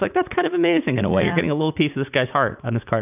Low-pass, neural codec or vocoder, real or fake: 3.6 kHz; vocoder, 44.1 kHz, 80 mel bands, Vocos; fake